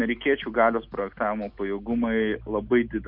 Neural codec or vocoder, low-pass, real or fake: none; 5.4 kHz; real